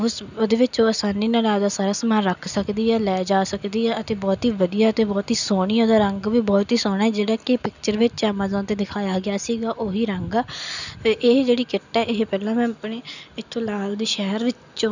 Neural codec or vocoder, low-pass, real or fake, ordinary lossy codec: none; 7.2 kHz; real; none